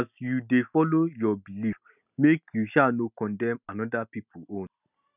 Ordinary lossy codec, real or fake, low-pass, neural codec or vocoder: none; real; 3.6 kHz; none